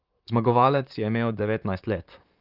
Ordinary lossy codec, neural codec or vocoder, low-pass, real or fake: Opus, 24 kbps; vocoder, 44.1 kHz, 128 mel bands, Pupu-Vocoder; 5.4 kHz; fake